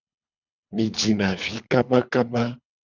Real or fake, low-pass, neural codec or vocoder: fake; 7.2 kHz; codec, 24 kHz, 3 kbps, HILCodec